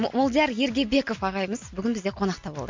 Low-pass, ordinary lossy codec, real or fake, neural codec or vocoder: 7.2 kHz; MP3, 48 kbps; real; none